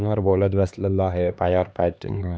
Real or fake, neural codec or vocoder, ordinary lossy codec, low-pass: fake; codec, 16 kHz, 2 kbps, X-Codec, HuBERT features, trained on LibriSpeech; none; none